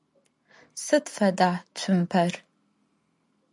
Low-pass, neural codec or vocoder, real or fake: 10.8 kHz; none; real